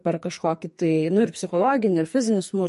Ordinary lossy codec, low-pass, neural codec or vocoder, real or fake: MP3, 48 kbps; 14.4 kHz; codec, 44.1 kHz, 2.6 kbps, SNAC; fake